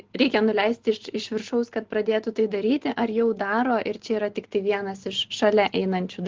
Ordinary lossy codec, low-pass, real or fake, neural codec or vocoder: Opus, 16 kbps; 7.2 kHz; real; none